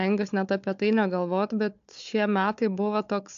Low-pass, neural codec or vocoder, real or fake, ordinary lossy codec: 7.2 kHz; codec, 16 kHz, 4 kbps, FunCodec, trained on Chinese and English, 50 frames a second; fake; AAC, 64 kbps